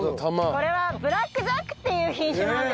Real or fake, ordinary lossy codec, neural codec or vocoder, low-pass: real; none; none; none